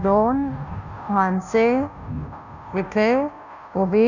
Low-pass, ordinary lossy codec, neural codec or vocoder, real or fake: 7.2 kHz; none; codec, 16 kHz, 0.5 kbps, FunCodec, trained on Chinese and English, 25 frames a second; fake